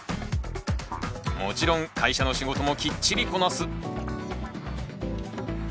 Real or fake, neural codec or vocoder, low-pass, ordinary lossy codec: real; none; none; none